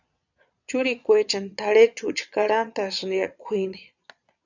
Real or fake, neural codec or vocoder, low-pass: fake; vocoder, 22.05 kHz, 80 mel bands, Vocos; 7.2 kHz